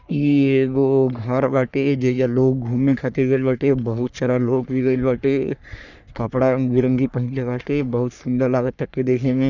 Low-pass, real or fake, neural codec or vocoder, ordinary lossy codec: 7.2 kHz; fake; codec, 44.1 kHz, 3.4 kbps, Pupu-Codec; none